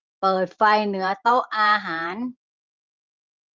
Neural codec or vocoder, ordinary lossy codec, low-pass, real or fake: vocoder, 44.1 kHz, 128 mel bands every 512 samples, BigVGAN v2; Opus, 32 kbps; 7.2 kHz; fake